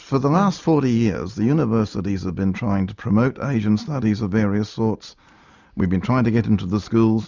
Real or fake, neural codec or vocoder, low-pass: real; none; 7.2 kHz